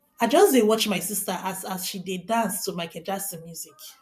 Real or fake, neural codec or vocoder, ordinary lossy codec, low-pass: fake; vocoder, 44.1 kHz, 128 mel bands every 512 samples, BigVGAN v2; none; 14.4 kHz